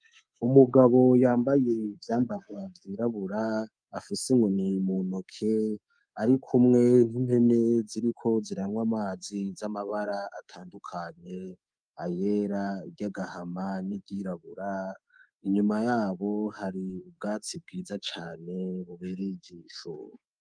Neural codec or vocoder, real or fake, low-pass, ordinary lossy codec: codec, 24 kHz, 3.1 kbps, DualCodec; fake; 9.9 kHz; Opus, 32 kbps